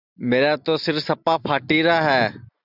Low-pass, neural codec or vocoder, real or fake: 5.4 kHz; none; real